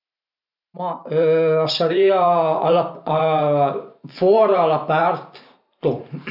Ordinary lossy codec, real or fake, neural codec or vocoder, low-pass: none; fake; vocoder, 44.1 kHz, 128 mel bands every 512 samples, BigVGAN v2; 5.4 kHz